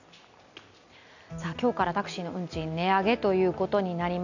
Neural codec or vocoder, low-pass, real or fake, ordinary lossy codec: none; 7.2 kHz; real; none